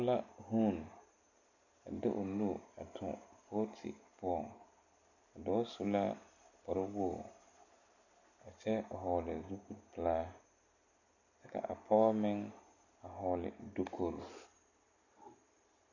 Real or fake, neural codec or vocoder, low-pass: real; none; 7.2 kHz